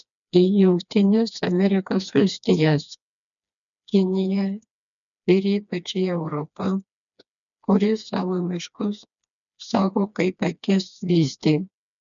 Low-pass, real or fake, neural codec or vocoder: 7.2 kHz; fake; codec, 16 kHz, 2 kbps, FreqCodec, smaller model